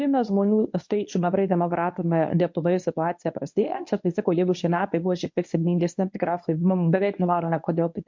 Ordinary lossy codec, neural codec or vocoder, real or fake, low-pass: MP3, 48 kbps; codec, 24 kHz, 0.9 kbps, WavTokenizer, medium speech release version 1; fake; 7.2 kHz